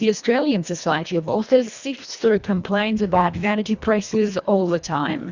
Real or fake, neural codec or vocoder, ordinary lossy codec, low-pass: fake; codec, 24 kHz, 1.5 kbps, HILCodec; Opus, 64 kbps; 7.2 kHz